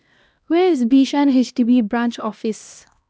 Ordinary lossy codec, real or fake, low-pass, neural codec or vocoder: none; fake; none; codec, 16 kHz, 1 kbps, X-Codec, HuBERT features, trained on LibriSpeech